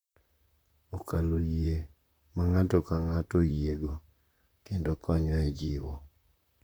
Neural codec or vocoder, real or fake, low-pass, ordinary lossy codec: vocoder, 44.1 kHz, 128 mel bands, Pupu-Vocoder; fake; none; none